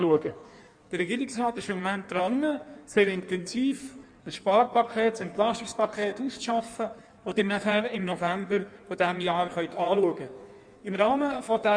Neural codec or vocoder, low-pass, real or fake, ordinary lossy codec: codec, 16 kHz in and 24 kHz out, 1.1 kbps, FireRedTTS-2 codec; 9.9 kHz; fake; none